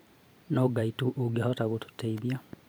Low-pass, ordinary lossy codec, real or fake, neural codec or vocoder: none; none; fake; vocoder, 44.1 kHz, 128 mel bands every 512 samples, BigVGAN v2